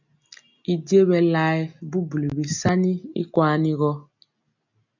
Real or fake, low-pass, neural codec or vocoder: real; 7.2 kHz; none